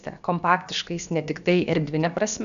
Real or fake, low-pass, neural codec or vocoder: fake; 7.2 kHz; codec, 16 kHz, about 1 kbps, DyCAST, with the encoder's durations